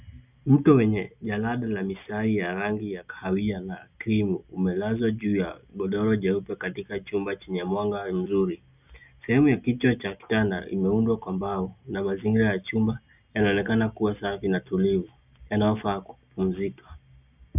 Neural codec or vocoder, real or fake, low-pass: none; real; 3.6 kHz